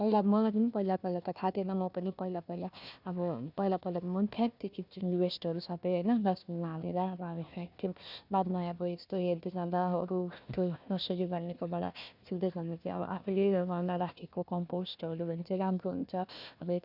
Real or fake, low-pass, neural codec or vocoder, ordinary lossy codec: fake; 5.4 kHz; codec, 16 kHz, 1 kbps, FunCodec, trained on Chinese and English, 50 frames a second; none